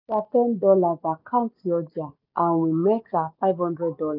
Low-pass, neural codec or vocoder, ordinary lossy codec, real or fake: 5.4 kHz; none; none; real